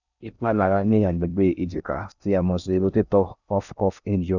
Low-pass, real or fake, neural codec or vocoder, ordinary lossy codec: 7.2 kHz; fake; codec, 16 kHz in and 24 kHz out, 0.6 kbps, FocalCodec, streaming, 4096 codes; none